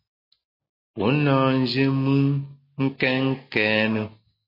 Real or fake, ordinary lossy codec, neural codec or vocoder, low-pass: real; MP3, 24 kbps; none; 5.4 kHz